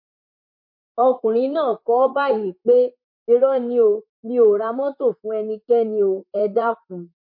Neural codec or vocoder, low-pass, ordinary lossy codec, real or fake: vocoder, 44.1 kHz, 128 mel bands, Pupu-Vocoder; 5.4 kHz; MP3, 32 kbps; fake